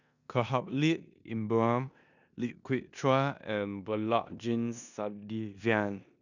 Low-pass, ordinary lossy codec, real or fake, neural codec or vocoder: 7.2 kHz; none; fake; codec, 16 kHz in and 24 kHz out, 0.9 kbps, LongCat-Audio-Codec, four codebook decoder